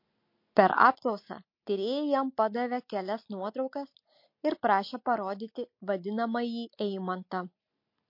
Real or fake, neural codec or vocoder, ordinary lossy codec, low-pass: real; none; MP3, 32 kbps; 5.4 kHz